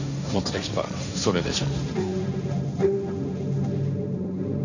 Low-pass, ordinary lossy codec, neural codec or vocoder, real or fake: 7.2 kHz; none; codec, 16 kHz, 1.1 kbps, Voila-Tokenizer; fake